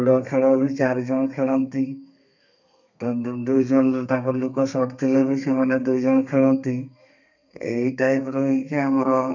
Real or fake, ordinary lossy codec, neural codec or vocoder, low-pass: fake; none; codec, 32 kHz, 1.9 kbps, SNAC; 7.2 kHz